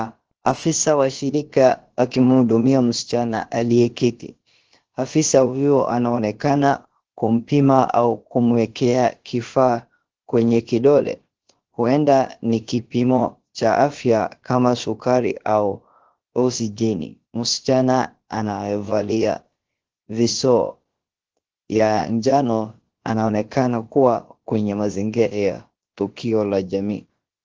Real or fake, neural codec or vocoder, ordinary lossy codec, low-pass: fake; codec, 16 kHz, about 1 kbps, DyCAST, with the encoder's durations; Opus, 16 kbps; 7.2 kHz